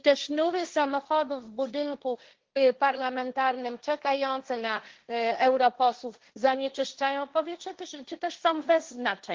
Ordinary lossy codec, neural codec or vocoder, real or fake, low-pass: Opus, 16 kbps; codec, 16 kHz, 1.1 kbps, Voila-Tokenizer; fake; 7.2 kHz